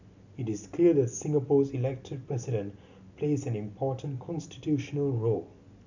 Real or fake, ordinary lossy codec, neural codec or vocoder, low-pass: real; none; none; 7.2 kHz